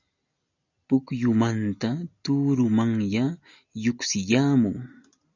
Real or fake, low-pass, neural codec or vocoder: real; 7.2 kHz; none